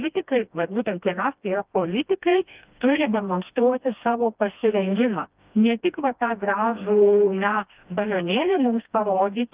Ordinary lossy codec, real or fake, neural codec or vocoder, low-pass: Opus, 24 kbps; fake; codec, 16 kHz, 1 kbps, FreqCodec, smaller model; 3.6 kHz